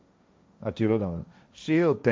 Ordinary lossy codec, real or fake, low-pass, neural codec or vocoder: none; fake; none; codec, 16 kHz, 1.1 kbps, Voila-Tokenizer